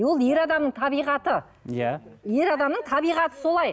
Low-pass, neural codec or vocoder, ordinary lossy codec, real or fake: none; none; none; real